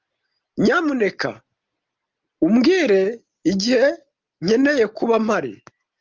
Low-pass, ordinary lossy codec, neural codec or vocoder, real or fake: 7.2 kHz; Opus, 32 kbps; none; real